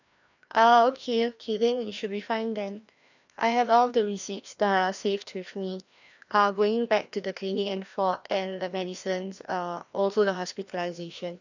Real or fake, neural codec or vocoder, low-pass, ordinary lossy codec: fake; codec, 16 kHz, 1 kbps, FreqCodec, larger model; 7.2 kHz; none